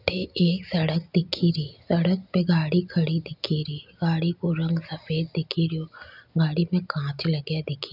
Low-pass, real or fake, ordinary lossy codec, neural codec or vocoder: 5.4 kHz; real; none; none